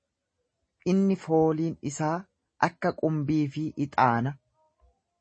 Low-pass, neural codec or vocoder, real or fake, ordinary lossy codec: 9.9 kHz; none; real; MP3, 32 kbps